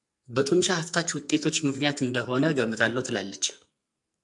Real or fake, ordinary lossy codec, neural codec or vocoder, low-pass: fake; MP3, 64 kbps; codec, 44.1 kHz, 2.6 kbps, SNAC; 10.8 kHz